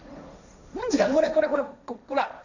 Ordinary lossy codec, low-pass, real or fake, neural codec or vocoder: none; 7.2 kHz; fake; codec, 16 kHz, 1.1 kbps, Voila-Tokenizer